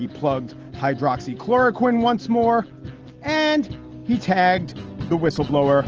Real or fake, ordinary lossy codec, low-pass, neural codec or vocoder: real; Opus, 16 kbps; 7.2 kHz; none